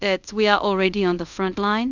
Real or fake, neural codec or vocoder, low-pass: fake; codec, 24 kHz, 0.5 kbps, DualCodec; 7.2 kHz